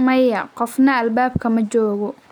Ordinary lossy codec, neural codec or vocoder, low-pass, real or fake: none; none; 19.8 kHz; real